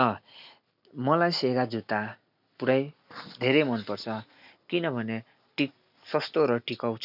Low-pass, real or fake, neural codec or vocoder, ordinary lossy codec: 5.4 kHz; real; none; none